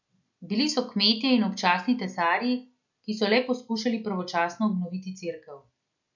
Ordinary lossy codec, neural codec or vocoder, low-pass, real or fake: none; none; 7.2 kHz; real